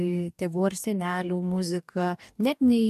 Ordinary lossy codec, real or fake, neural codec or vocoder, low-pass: AAC, 96 kbps; fake; codec, 44.1 kHz, 2.6 kbps, DAC; 14.4 kHz